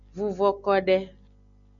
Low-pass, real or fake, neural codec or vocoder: 7.2 kHz; real; none